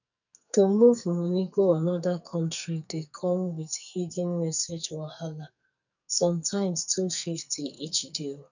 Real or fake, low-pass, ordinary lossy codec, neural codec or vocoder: fake; 7.2 kHz; none; codec, 44.1 kHz, 2.6 kbps, SNAC